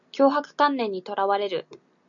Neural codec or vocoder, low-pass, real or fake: none; 7.2 kHz; real